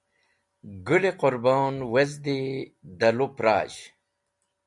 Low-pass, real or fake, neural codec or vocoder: 10.8 kHz; real; none